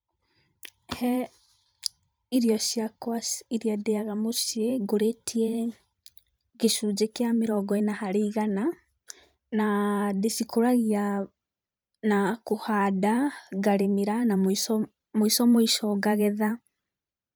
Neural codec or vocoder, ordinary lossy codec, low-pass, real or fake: vocoder, 44.1 kHz, 128 mel bands every 512 samples, BigVGAN v2; none; none; fake